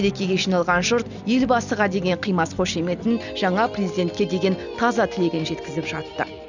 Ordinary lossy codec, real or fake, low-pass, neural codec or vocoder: none; real; 7.2 kHz; none